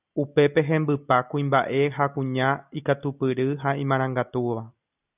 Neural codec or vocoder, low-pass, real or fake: none; 3.6 kHz; real